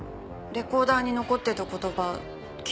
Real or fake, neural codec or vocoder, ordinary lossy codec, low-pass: real; none; none; none